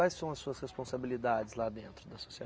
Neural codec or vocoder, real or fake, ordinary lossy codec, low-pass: none; real; none; none